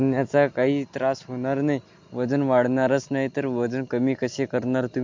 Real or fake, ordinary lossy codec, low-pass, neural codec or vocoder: real; MP3, 48 kbps; 7.2 kHz; none